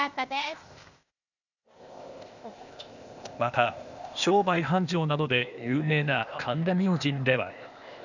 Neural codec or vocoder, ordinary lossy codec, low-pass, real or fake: codec, 16 kHz, 0.8 kbps, ZipCodec; none; 7.2 kHz; fake